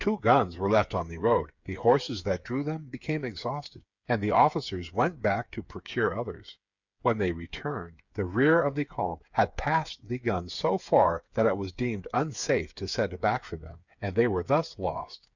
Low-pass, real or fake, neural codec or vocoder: 7.2 kHz; fake; codec, 16 kHz, 8 kbps, FreqCodec, smaller model